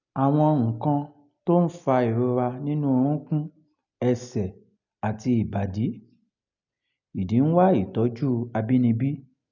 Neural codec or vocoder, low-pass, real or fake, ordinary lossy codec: none; 7.2 kHz; real; none